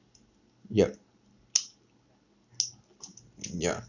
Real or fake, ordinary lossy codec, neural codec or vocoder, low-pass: real; none; none; 7.2 kHz